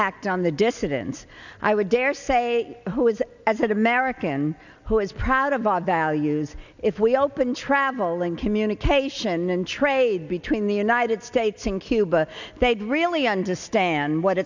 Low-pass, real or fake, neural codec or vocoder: 7.2 kHz; real; none